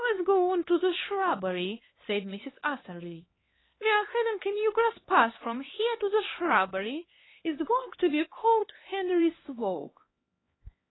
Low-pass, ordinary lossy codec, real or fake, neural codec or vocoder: 7.2 kHz; AAC, 16 kbps; fake; codec, 16 kHz, 2 kbps, X-Codec, HuBERT features, trained on LibriSpeech